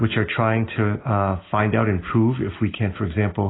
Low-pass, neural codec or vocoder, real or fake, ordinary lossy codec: 7.2 kHz; none; real; AAC, 16 kbps